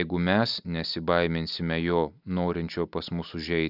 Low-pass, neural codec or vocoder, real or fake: 5.4 kHz; none; real